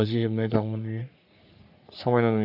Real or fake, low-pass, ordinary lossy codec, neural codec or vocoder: fake; 5.4 kHz; none; codec, 44.1 kHz, 3.4 kbps, Pupu-Codec